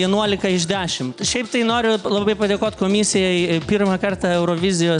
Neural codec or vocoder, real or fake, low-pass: none; real; 9.9 kHz